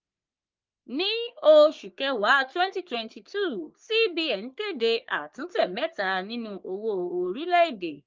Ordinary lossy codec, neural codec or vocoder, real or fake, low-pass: Opus, 24 kbps; codec, 44.1 kHz, 3.4 kbps, Pupu-Codec; fake; 7.2 kHz